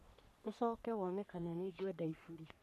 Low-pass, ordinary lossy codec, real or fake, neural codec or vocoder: 14.4 kHz; none; fake; codec, 44.1 kHz, 3.4 kbps, Pupu-Codec